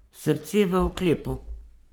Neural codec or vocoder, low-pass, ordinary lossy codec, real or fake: codec, 44.1 kHz, 3.4 kbps, Pupu-Codec; none; none; fake